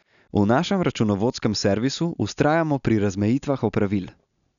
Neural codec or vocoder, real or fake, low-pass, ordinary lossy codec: none; real; 7.2 kHz; none